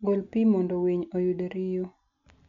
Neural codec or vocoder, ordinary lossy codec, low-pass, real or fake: none; none; 7.2 kHz; real